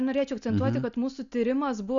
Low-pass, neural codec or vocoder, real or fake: 7.2 kHz; none; real